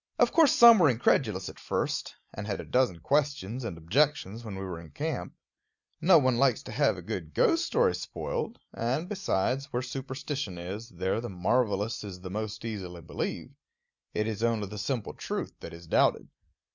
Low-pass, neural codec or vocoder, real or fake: 7.2 kHz; none; real